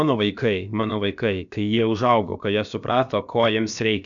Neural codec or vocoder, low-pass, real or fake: codec, 16 kHz, about 1 kbps, DyCAST, with the encoder's durations; 7.2 kHz; fake